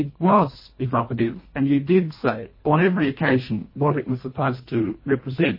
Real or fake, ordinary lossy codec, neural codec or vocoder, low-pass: fake; MP3, 24 kbps; codec, 24 kHz, 1.5 kbps, HILCodec; 5.4 kHz